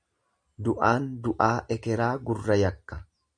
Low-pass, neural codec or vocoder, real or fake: 9.9 kHz; none; real